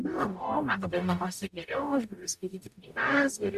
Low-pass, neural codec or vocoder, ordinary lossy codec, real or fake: 14.4 kHz; codec, 44.1 kHz, 0.9 kbps, DAC; MP3, 96 kbps; fake